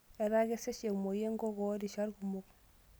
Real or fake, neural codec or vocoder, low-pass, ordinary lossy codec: real; none; none; none